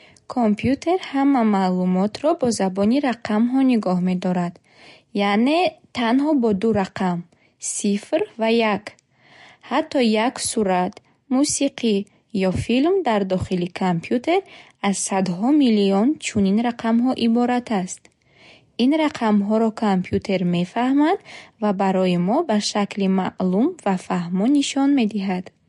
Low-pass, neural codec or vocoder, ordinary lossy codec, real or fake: 14.4 kHz; none; MP3, 48 kbps; real